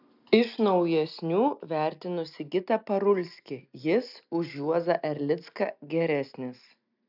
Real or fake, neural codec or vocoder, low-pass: real; none; 5.4 kHz